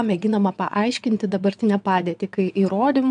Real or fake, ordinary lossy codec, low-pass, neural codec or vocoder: fake; AAC, 96 kbps; 9.9 kHz; vocoder, 22.05 kHz, 80 mel bands, WaveNeXt